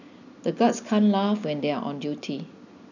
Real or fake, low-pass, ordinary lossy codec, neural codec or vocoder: real; 7.2 kHz; none; none